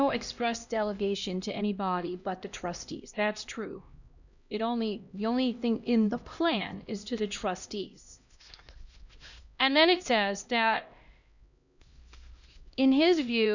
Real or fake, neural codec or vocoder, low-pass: fake; codec, 16 kHz, 1 kbps, X-Codec, HuBERT features, trained on LibriSpeech; 7.2 kHz